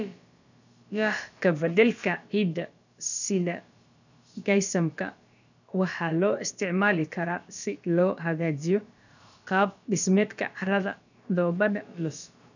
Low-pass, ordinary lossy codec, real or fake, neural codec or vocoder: 7.2 kHz; none; fake; codec, 16 kHz, about 1 kbps, DyCAST, with the encoder's durations